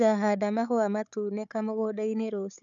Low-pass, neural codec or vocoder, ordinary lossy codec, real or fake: 7.2 kHz; codec, 16 kHz, 4 kbps, FunCodec, trained on Chinese and English, 50 frames a second; none; fake